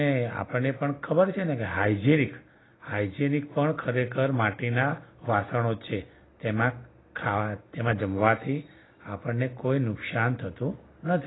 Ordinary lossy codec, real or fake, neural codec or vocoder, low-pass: AAC, 16 kbps; real; none; 7.2 kHz